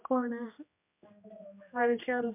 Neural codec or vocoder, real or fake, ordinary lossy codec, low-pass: codec, 16 kHz, 1 kbps, X-Codec, HuBERT features, trained on general audio; fake; MP3, 32 kbps; 3.6 kHz